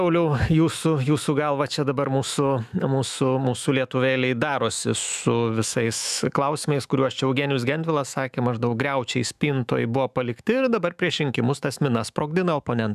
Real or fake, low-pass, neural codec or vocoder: fake; 14.4 kHz; autoencoder, 48 kHz, 128 numbers a frame, DAC-VAE, trained on Japanese speech